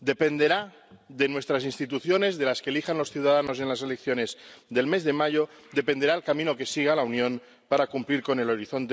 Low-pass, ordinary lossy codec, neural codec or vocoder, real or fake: none; none; none; real